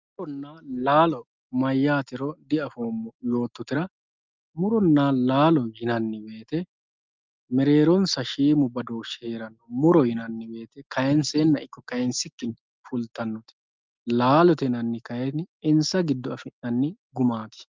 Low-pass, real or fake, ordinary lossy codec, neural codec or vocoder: 7.2 kHz; real; Opus, 24 kbps; none